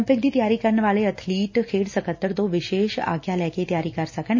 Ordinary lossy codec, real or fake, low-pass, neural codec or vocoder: MP3, 32 kbps; real; 7.2 kHz; none